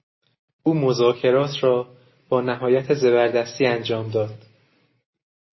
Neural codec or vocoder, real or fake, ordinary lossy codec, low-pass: none; real; MP3, 24 kbps; 7.2 kHz